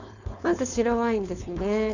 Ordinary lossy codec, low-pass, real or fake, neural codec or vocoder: none; 7.2 kHz; fake; codec, 16 kHz, 4.8 kbps, FACodec